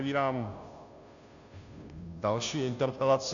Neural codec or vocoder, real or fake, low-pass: codec, 16 kHz, 0.5 kbps, FunCodec, trained on Chinese and English, 25 frames a second; fake; 7.2 kHz